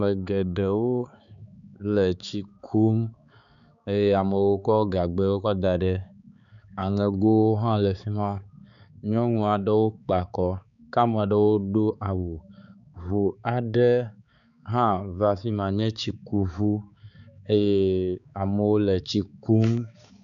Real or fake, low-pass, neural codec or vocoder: fake; 7.2 kHz; codec, 16 kHz, 4 kbps, X-Codec, HuBERT features, trained on balanced general audio